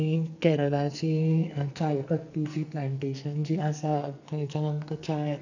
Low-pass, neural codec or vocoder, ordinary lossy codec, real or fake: 7.2 kHz; codec, 44.1 kHz, 2.6 kbps, SNAC; none; fake